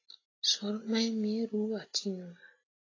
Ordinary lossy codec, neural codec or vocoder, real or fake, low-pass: AAC, 32 kbps; none; real; 7.2 kHz